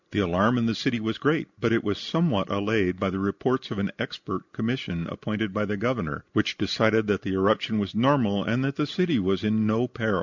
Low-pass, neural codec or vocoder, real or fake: 7.2 kHz; none; real